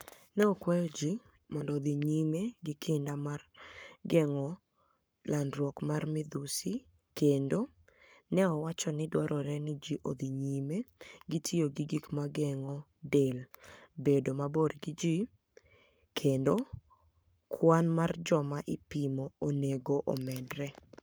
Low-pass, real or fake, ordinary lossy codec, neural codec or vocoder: none; fake; none; codec, 44.1 kHz, 7.8 kbps, Pupu-Codec